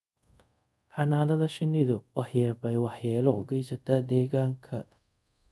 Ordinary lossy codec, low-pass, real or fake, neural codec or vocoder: none; none; fake; codec, 24 kHz, 0.5 kbps, DualCodec